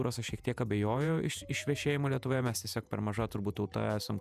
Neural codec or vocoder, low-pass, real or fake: vocoder, 48 kHz, 128 mel bands, Vocos; 14.4 kHz; fake